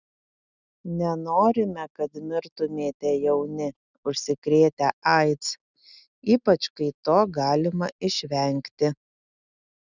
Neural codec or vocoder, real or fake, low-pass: none; real; 7.2 kHz